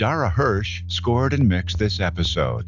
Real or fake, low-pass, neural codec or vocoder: real; 7.2 kHz; none